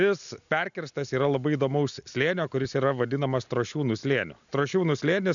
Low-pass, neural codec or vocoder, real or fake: 7.2 kHz; none; real